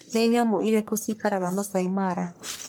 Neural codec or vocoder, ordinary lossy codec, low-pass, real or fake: codec, 44.1 kHz, 1.7 kbps, Pupu-Codec; none; none; fake